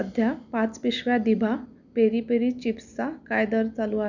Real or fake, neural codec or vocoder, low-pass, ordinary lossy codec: real; none; 7.2 kHz; none